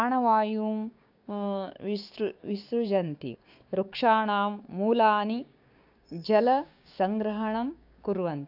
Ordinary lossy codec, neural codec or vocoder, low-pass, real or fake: AAC, 48 kbps; codec, 16 kHz, 6 kbps, DAC; 5.4 kHz; fake